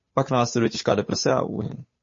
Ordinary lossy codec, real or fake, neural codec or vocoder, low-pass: MP3, 32 kbps; fake; codec, 16 kHz, 8 kbps, FunCodec, trained on Chinese and English, 25 frames a second; 7.2 kHz